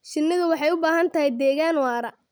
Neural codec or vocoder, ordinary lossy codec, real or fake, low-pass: none; none; real; none